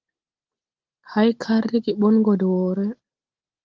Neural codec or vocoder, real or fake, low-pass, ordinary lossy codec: none; real; 7.2 kHz; Opus, 16 kbps